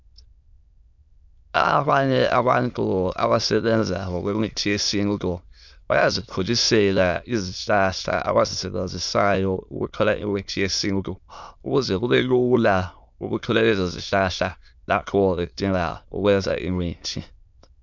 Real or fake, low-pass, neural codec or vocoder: fake; 7.2 kHz; autoencoder, 22.05 kHz, a latent of 192 numbers a frame, VITS, trained on many speakers